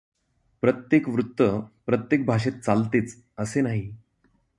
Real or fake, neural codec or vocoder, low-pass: real; none; 10.8 kHz